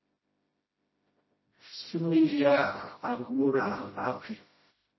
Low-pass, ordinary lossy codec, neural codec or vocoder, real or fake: 7.2 kHz; MP3, 24 kbps; codec, 16 kHz, 0.5 kbps, FreqCodec, smaller model; fake